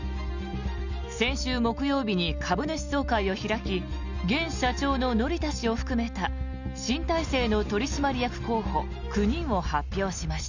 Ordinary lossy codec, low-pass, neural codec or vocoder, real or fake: none; 7.2 kHz; none; real